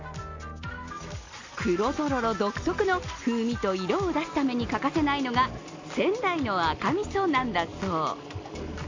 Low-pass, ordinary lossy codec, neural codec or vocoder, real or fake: 7.2 kHz; AAC, 48 kbps; none; real